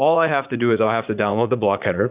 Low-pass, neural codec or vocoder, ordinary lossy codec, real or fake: 3.6 kHz; vocoder, 44.1 kHz, 80 mel bands, Vocos; Opus, 64 kbps; fake